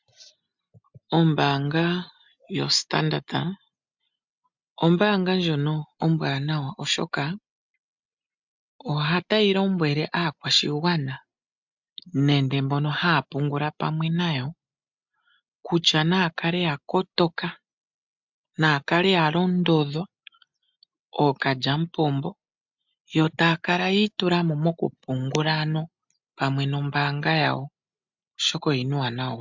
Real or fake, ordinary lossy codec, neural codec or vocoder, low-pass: real; MP3, 64 kbps; none; 7.2 kHz